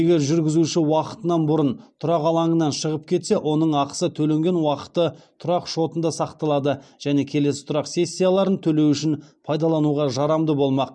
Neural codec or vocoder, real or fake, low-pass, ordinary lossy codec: none; real; 9.9 kHz; none